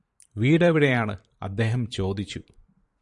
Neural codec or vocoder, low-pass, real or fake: vocoder, 44.1 kHz, 128 mel bands every 512 samples, BigVGAN v2; 10.8 kHz; fake